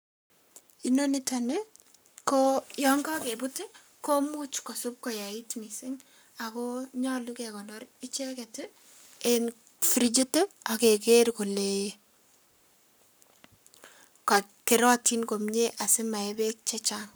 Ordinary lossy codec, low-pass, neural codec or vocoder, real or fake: none; none; codec, 44.1 kHz, 7.8 kbps, Pupu-Codec; fake